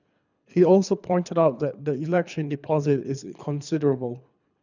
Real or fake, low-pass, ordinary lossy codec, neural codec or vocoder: fake; 7.2 kHz; none; codec, 24 kHz, 3 kbps, HILCodec